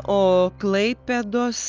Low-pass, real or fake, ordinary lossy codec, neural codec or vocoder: 7.2 kHz; real; Opus, 24 kbps; none